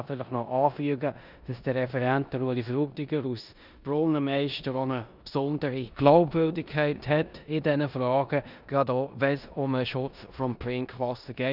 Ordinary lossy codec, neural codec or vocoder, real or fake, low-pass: none; codec, 16 kHz in and 24 kHz out, 0.9 kbps, LongCat-Audio-Codec, four codebook decoder; fake; 5.4 kHz